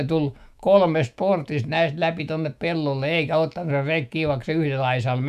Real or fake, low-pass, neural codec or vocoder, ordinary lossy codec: fake; 14.4 kHz; autoencoder, 48 kHz, 128 numbers a frame, DAC-VAE, trained on Japanese speech; none